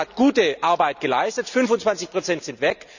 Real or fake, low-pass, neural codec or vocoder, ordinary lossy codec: real; 7.2 kHz; none; none